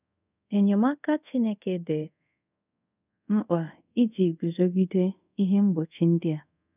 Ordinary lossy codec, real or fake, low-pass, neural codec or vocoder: none; fake; 3.6 kHz; codec, 24 kHz, 0.5 kbps, DualCodec